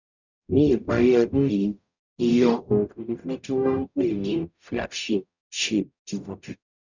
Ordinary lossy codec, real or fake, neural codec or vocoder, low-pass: none; fake; codec, 44.1 kHz, 0.9 kbps, DAC; 7.2 kHz